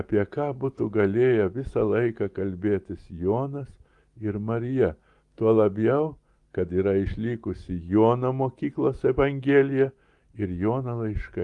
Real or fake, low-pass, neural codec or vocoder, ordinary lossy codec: real; 10.8 kHz; none; Opus, 24 kbps